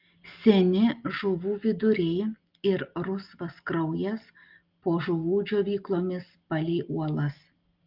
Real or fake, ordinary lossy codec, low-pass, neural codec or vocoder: real; Opus, 32 kbps; 5.4 kHz; none